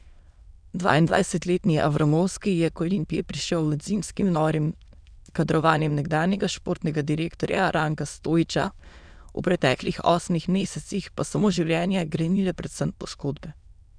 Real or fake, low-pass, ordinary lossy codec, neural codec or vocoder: fake; 9.9 kHz; none; autoencoder, 22.05 kHz, a latent of 192 numbers a frame, VITS, trained on many speakers